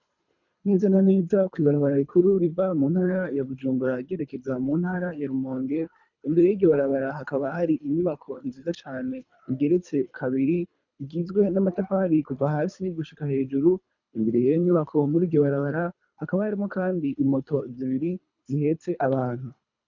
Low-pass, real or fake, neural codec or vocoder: 7.2 kHz; fake; codec, 24 kHz, 3 kbps, HILCodec